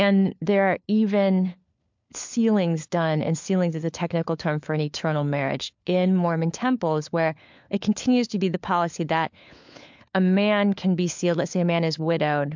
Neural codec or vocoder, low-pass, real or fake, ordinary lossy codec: codec, 16 kHz, 4 kbps, FunCodec, trained on LibriTTS, 50 frames a second; 7.2 kHz; fake; MP3, 64 kbps